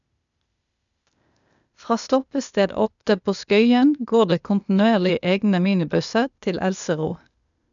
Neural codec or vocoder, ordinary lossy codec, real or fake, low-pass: codec, 16 kHz, 0.8 kbps, ZipCodec; none; fake; 7.2 kHz